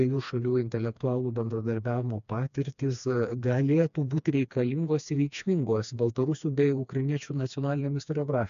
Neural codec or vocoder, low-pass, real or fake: codec, 16 kHz, 2 kbps, FreqCodec, smaller model; 7.2 kHz; fake